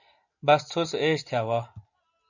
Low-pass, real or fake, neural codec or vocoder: 7.2 kHz; real; none